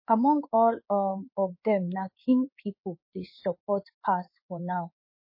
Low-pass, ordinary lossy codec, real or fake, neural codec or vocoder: 5.4 kHz; MP3, 24 kbps; fake; codec, 16 kHz in and 24 kHz out, 1 kbps, XY-Tokenizer